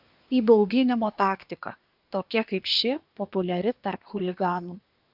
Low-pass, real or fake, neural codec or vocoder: 5.4 kHz; fake; codec, 24 kHz, 1 kbps, SNAC